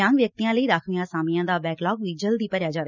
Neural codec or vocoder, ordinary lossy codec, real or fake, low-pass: none; none; real; 7.2 kHz